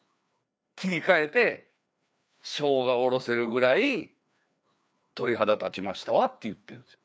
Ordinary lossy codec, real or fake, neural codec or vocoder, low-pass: none; fake; codec, 16 kHz, 2 kbps, FreqCodec, larger model; none